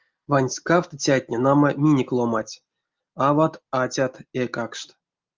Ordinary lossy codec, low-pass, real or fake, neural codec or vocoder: Opus, 32 kbps; 7.2 kHz; real; none